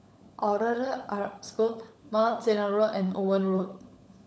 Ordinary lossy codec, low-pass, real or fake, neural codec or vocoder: none; none; fake; codec, 16 kHz, 16 kbps, FunCodec, trained on LibriTTS, 50 frames a second